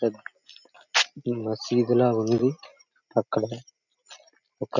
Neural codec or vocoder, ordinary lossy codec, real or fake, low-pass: none; none; real; 7.2 kHz